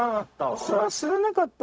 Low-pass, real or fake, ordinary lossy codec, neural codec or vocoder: none; fake; none; codec, 16 kHz, 0.4 kbps, LongCat-Audio-Codec